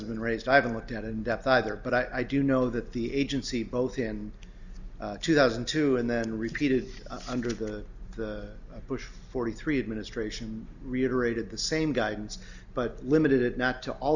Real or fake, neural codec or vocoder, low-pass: real; none; 7.2 kHz